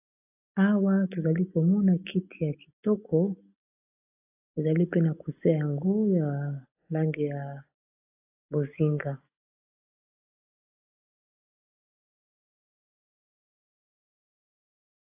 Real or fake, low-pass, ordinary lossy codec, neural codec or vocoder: real; 3.6 kHz; AAC, 32 kbps; none